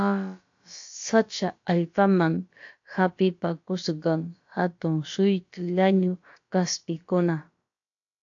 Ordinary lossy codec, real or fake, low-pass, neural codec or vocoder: AAC, 48 kbps; fake; 7.2 kHz; codec, 16 kHz, about 1 kbps, DyCAST, with the encoder's durations